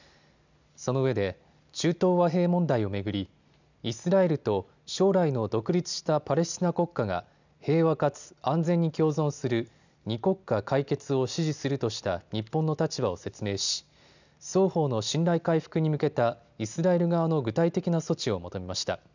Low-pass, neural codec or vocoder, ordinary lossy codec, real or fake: 7.2 kHz; none; none; real